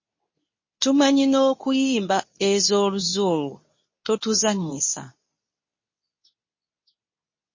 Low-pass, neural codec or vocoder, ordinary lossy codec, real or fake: 7.2 kHz; codec, 24 kHz, 0.9 kbps, WavTokenizer, medium speech release version 2; MP3, 32 kbps; fake